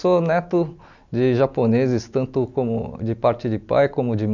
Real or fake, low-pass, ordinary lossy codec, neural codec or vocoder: real; 7.2 kHz; none; none